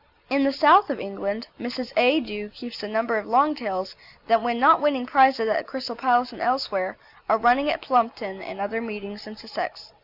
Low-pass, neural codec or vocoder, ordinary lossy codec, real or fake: 5.4 kHz; none; Opus, 64 kbps; real